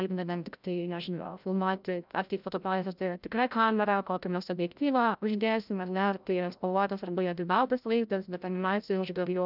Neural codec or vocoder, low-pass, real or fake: codec, 16 kHz, 0.5 kbps, FreqCodec, larger model; 5.4 kHz; fake